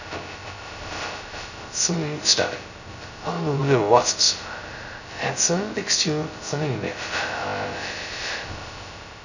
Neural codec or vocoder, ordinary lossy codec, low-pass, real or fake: codec, 16 kHz, 0.2 kbps, FocalCodec; none; 7.2 kHz; fake